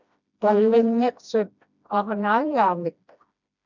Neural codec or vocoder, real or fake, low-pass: codec, 16 kHz, 1 kbps, FreqCodec, smaller model; fake; 7.2 kHz